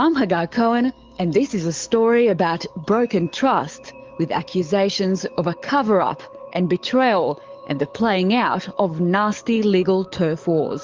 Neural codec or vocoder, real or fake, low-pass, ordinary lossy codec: codec, 44.1 kHz, 7.8 kbps, DAC; fake; 7.2 kHz; Opus, 32 kbps